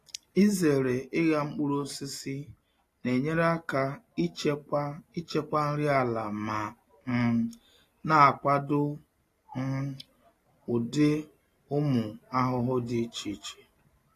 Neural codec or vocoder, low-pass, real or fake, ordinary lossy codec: none; 14.4 kHz; real; AAC, 48 kbps